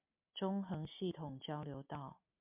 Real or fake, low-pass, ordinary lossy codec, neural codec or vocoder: real; 3.6 kHz; MP3, 32 kbps; none